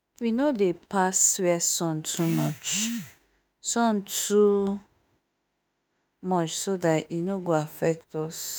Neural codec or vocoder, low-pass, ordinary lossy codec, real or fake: autoencoder, 48 kHz, 32 numbers a frame, DAC-VAE, trained on Japanese speech; none; none; fake